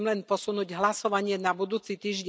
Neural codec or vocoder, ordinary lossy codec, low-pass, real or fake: none; none; none; real